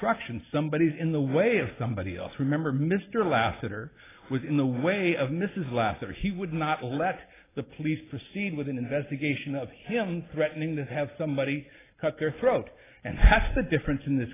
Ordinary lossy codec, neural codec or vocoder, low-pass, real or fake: AAC, 16 kbps; none; 3.6 kHz; real